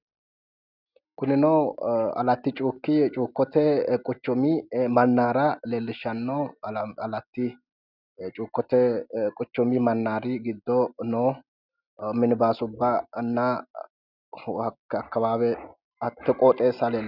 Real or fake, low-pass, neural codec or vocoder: real; 5.4 kHz; none